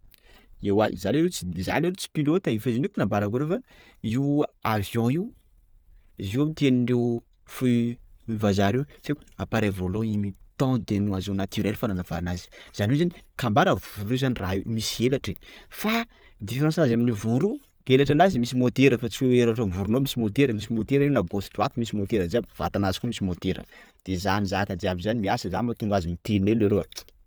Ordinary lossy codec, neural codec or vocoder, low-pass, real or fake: none; none; none; real